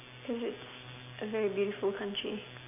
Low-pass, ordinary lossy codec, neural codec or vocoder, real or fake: 3.6 kHz; none; none; real